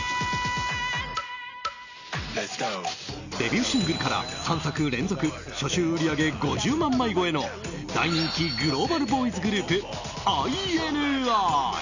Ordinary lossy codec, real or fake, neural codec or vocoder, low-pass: none; real; none; 7.2 kHz